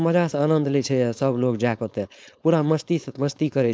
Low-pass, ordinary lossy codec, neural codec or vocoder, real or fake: none; none; codec, 16 kHz, 4.8 kbps, FACodec; fake